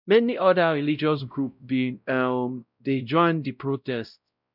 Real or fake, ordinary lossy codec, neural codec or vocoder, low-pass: fake; none; codec, 16 kHz, 0.5 kbps, X-Codec, WavLM features, trained on Multilingual LibriSpeech; 5.4 kHz